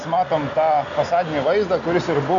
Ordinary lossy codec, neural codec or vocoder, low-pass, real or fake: MP3, 96 kbps; none; 7.2 kHz; real